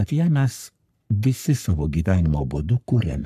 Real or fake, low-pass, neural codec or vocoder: fake; 14.4 kHz; codec, 44.1 kHz, 3.4 kbps, Pupu-Codec